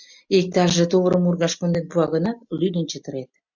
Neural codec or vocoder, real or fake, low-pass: none; real; 7.2 kHz